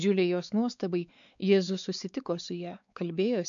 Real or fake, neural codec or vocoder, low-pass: fake; codec, 16 kHz, 4 kbps, X-Codec, WavLM features, trained on Multilingual LibriSpeech; 7.2 kHz